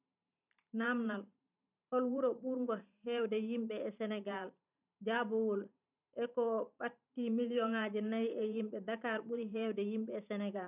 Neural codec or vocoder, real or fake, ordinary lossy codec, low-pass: vocoder, 44.1 kHz, 128 mel bands every 512 samples, BigVGAN v2; fake; none; 3.6 kHz